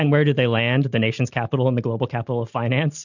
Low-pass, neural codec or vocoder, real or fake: 7.2 kHz; none; real